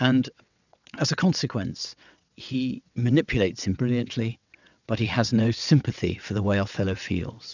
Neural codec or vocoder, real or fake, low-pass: vocoder, 22.05 kHz, 80 mel bands, WaveNeXt; fake; 7.2 kHz